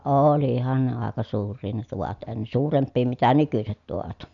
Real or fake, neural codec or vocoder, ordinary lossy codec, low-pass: real; none; none; 7.2 kHz